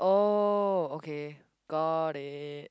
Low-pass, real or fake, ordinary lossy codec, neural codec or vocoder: none; real; none; none